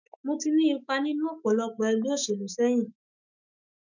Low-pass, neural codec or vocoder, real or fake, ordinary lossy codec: 7.2 kHz; autoencoder, 48 kHz, 128 numbers a frame, DAC-VAE, trained on Japanese speech; fake; none